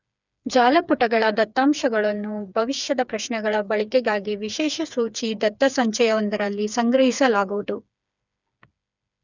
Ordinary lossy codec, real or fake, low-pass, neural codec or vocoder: none; fake; 7.2 kHz; codec, 16 kHz, 4 kbps, FreqCodec, smaller model